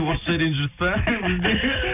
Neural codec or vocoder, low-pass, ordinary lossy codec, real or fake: none; 3.6 kHz; none; real